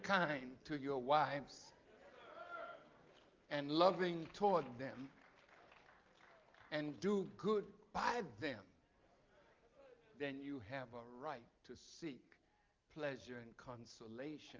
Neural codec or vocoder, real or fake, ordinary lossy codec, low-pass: none; real; Opus, 32 kbps; 7.2 kHz